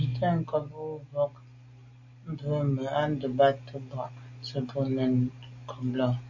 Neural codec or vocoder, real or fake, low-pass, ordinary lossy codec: none; real; 7.2 kHz; AAC, 48 kbps